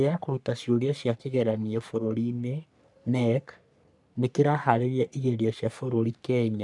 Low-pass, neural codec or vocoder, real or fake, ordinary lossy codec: 10.8 kHz; codec, 44.1 kHz, 3.4 kbps, Pupu-Codec; fake; none